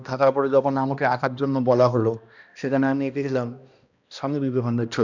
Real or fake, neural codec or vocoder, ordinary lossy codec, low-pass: fake; codec, 16 kHz, 1 kbps, X-Codec, HuBERT features, trained on balanced general audio; none; 7.2 kHz